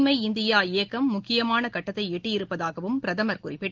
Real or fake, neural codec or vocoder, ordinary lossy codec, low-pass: real; none; Opus, 16 kbps; 7.2 kHz